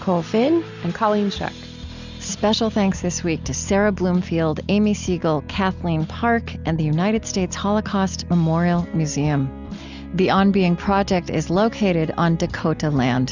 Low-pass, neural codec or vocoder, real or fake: 7.2 kHz; none; real